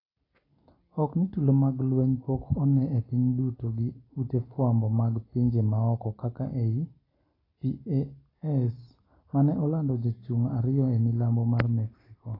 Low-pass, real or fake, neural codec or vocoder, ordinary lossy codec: 5.4 kHz; real; none; AAC, 24 kbps